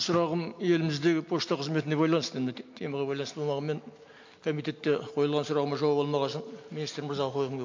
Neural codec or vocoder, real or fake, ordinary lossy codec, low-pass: none; real; MP3, 48 kbps; 7.2 kHz